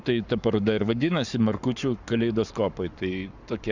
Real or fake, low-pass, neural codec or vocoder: fake; 7.2 kHz; codec, 44.1 kHz, 7.8 kbps, Pupu-Codec